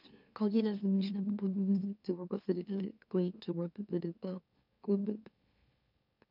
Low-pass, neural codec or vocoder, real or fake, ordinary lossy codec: 5.4 kHz; autoencoder, 44.1 kHz, a latent of 192 numbers a frame, MeloTTS; fake; none